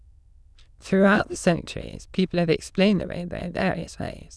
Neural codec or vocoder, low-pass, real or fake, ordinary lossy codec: autoencoder, 22.05 kHz, a latent of 192 numbers a frame, VITS, trained on many speakers; none; fake; none